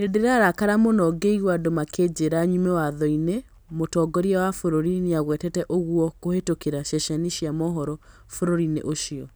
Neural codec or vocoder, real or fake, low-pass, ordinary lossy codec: none; real; none; none